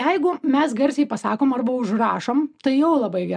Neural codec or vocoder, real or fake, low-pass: none; real; 9.9 kHz